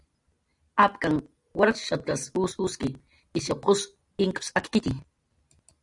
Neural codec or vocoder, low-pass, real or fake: none; 10.8 kHz; real